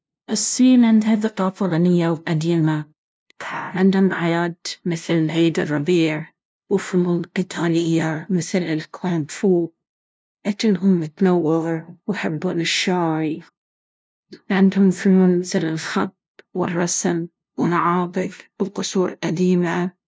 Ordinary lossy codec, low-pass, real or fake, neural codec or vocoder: none; none; fake; codec, 16 kHz, 0.5 kbps, FunCodec, trained on LibriTTS, 25 frames a second